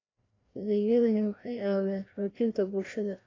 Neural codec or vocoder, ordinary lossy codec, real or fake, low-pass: codec, 16 kHz, 1 kbps, FreqCodec, larger model; AAC, 32 kbps; fake; 7.2 kHz